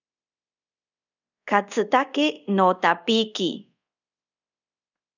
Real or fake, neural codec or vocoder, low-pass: fake; codec, 24 kHz, 0.5 kbps, DualCodec; 7.2 kHz